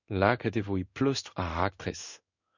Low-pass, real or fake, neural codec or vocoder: 7.2 kHz; fake; codec, 16 kHz in and 24 kHz out, 1 kbps, XY-Tokenizer